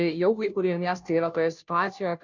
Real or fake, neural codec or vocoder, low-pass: fake; codec, 16 kHz, 0.5 kbps, FunCodec, trained on Chinese and English, 25 frames a second; 7.2 kHz